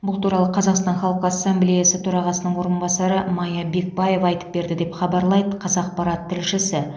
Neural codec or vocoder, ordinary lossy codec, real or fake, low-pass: none; none; real; none